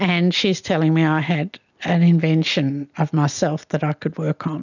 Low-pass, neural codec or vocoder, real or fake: 7.2 kHz; none; real